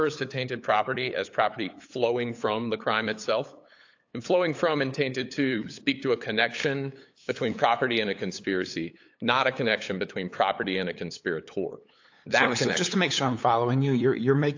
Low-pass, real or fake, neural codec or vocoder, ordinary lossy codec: 7.2 kHz; fake; codec, 16 kHz, 8 kbps, FunCodec, trained on LibriTTS, 25 frames a second; AAC, 48 kbps